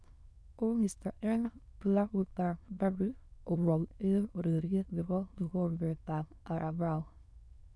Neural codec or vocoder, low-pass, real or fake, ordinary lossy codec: autoencoder, 22.05 kHz, a latent of 192 numbers a frame, VITS, trained on many speakers; none; fake; none